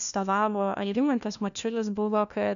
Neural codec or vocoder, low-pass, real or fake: codec, 16 kHz, 1 kbps, FunCodec, trained on LibriTTS, 50 frames a second; 7.2 kHz; fake